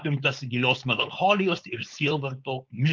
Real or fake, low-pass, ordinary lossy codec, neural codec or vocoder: fake; 7.2 kHz; Opus, 24 kbps; codec, 16 kHz, 4.8 kbps, FACodec